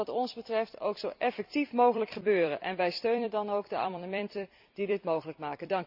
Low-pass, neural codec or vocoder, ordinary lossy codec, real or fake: 5.4 kHz; vocoder, 44.1 kHz, 128 mel bands every 256 samples, BigVGAN v2; none; fake